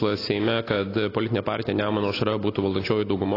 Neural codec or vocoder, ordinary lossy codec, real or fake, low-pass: none; AAC, 24 kbps; real; 5.4 kHz